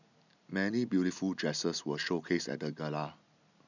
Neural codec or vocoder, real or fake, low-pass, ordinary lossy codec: none; real; 7.2 kHz; none